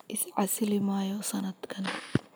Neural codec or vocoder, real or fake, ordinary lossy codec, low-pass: none; real; none; none